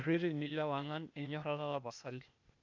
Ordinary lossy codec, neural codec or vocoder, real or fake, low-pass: MP3, 64 kbps; codec, 16 kHz, 0.8 kbps, ZipCodec; fake; 7.2 kHz